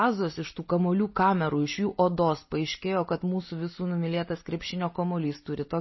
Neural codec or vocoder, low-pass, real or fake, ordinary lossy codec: none; 7.2 kHz; real; MP3, 24 kbps